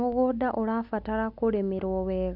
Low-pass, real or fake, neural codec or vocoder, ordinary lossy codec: 5.4 kHz; real; none; none